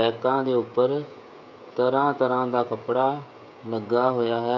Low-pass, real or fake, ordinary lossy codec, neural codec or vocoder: 7.2 kHz; fake; none; codec, 16 kHz, 16 kbps, FreqCodec, smaller model